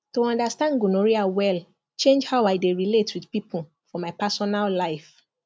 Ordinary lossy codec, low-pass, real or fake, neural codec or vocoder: none; none; real; none